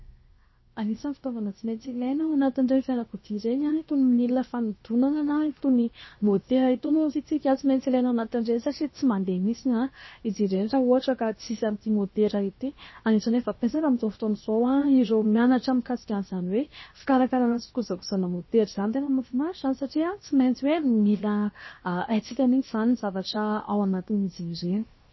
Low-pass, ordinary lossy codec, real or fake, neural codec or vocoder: 7.2 kHz; MP3, 24 kbps; fake; codec, 16 kHz, 0.7 kbps, FocalCodec